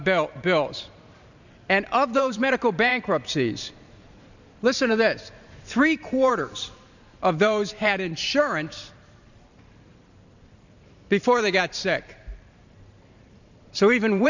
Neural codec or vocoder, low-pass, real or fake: vocoder, 44.1 kHz, 128 mel bands every 512 samples, BigVGAN v2; 7.2 kHz; fake